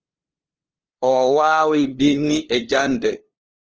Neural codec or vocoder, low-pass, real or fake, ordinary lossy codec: codec, 16 kHz, 2 kbps, FunCodec, trained on LibriTTS, 25 frames a second; 7.2 kHz; fake; Opus, 16 kbps